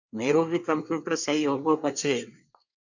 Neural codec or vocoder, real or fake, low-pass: codec, 24 kHz, 1 kbps, SNAC; fake; 7.2 kHz